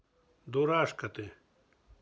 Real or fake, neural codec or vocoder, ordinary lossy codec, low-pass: real; none; none; none